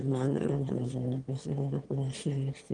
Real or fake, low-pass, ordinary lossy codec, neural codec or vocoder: fake; 9.9 kHz; Opus, 24 kbps; autoencoder, 22.05 kHz, a latent of 192 numbers a frame, VITS, trained on one speaker